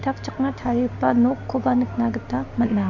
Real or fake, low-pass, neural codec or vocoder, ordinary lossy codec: real; 7.2 kHz; none; none